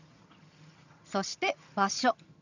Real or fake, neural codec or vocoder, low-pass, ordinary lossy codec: fake; vocoder, 22.05 kHz, 80 mel bands, HiFi-GAN; 7.2 kHz; none